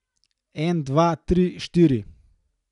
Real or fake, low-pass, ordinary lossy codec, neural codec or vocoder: real; 10.8 kHz; none; none